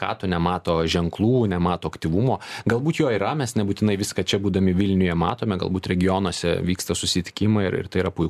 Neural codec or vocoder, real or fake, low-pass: none; real; 14.4 kHz